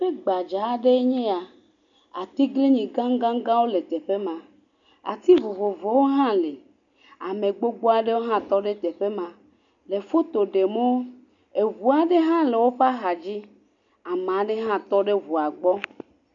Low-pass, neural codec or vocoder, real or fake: 7.2 kHz; none; real